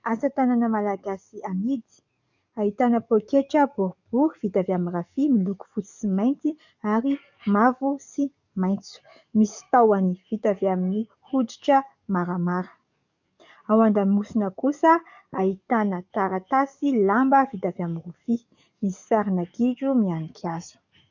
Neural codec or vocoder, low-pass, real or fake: codec, 44.1 kHz, 7.8 kbps, DAC; 7.2 kHz; fake